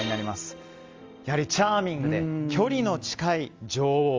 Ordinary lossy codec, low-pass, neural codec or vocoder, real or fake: Opus, 32 kbps; 7.2 kHz; none; real